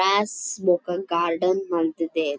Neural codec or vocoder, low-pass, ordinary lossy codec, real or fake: none; none; none; real